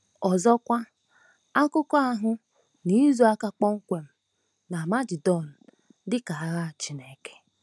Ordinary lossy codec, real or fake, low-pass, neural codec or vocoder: none; real; none; none